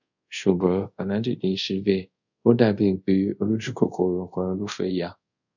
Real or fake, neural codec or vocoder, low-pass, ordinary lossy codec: fake; codec, 24 kHz, 0.5 kbps, DualCodec; 7.2 kHz; none